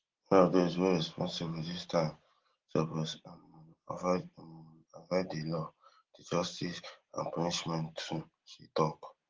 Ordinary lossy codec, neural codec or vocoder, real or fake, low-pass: Opus, 16 kbps; none; real; 7.2 kHz